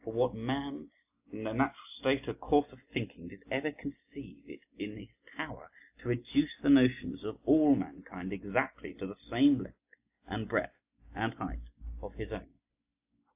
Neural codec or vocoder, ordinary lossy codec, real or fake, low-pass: none; AAC, 32 kbps; real; 3.6 kHz